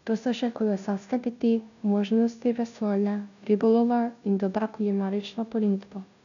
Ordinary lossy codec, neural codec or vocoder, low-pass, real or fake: none; codec, 16 kHz, 0.5 kbps, FunCodec, trained on Chinese and English, 25 frames a second; 7.2 kHz; fake